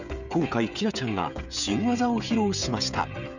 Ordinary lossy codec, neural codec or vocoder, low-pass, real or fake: none; codec, 16 kHz, 16 kbps, FreqCodec, smaller model; 7.2 kHz; fake